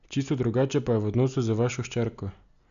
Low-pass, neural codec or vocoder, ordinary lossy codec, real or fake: 7.2 kHz; none; none; real